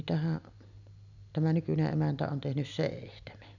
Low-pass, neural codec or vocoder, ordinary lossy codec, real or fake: 7.2 kHz; none; none; real